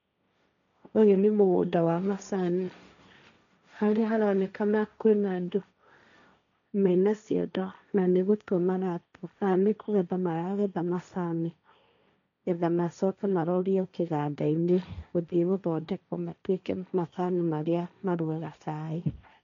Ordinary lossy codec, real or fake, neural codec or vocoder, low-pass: none; fake; codec, 16 kHz, 1.1 kbps, Voila-Tokenizer; 7.2 kHz